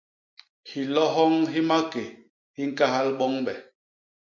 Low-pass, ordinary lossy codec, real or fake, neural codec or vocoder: 7.2 kHz; MP3, 48 kbps; real; none